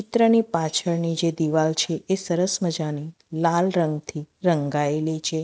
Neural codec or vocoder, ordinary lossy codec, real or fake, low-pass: none; none; real; none